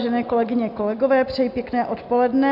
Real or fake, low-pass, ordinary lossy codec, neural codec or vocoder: real; 5.4 kHz; MP3, 48 kbps; none